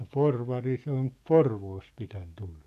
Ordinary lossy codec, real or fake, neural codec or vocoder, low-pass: none; fake; codec, 44.1 kHz, 7.8 kbps, DAC; 14.4 kHz